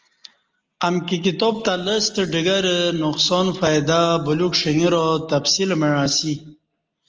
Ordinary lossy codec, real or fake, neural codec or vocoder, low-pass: Opus, 24 kbps; real; none; 7.2 kHz